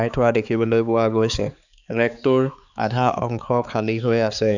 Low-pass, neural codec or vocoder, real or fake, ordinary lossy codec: 7.2 kHz; codec, 16 kHz, 4 kbps, X-Codec, HuBERT features, trained on balanced general audio; fake; none